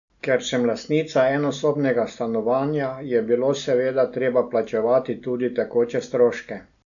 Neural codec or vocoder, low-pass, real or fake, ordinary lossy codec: none; 7.2 kHz; real; none